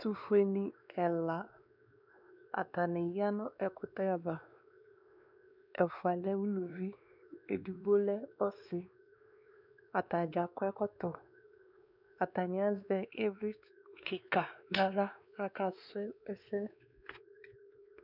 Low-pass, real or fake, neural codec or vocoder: 5.4 kHz; fake; codec, 16 kHz, 2 kbps, X-Codec, WavLM features, trained on Multilingual LibriSpeech